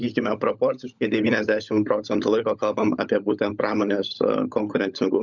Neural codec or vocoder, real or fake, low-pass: codec, 16 kHz, 16 kbps, FunCodec, trained on LibriTTS, 50 frames a second; fake; 7.2 kHz